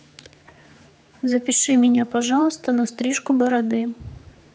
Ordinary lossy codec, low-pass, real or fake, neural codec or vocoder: none; none; fake; codec, 16 kHz, 4 kbps, X-Codec, HuBERT features, trained on general audio